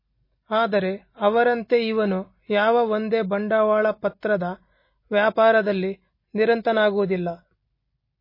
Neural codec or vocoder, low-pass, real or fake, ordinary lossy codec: none; 5.4 kHz; real; MP3, 24 kbps